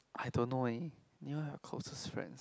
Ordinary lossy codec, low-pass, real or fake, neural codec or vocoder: none; none; real; none